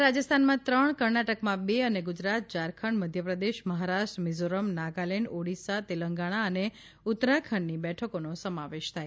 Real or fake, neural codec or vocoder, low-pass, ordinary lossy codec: real; none; none; none